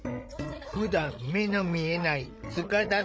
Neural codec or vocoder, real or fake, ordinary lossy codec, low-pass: codec, 16 kHz, 16 kbps, FreqCodec, larger model; fake; none; none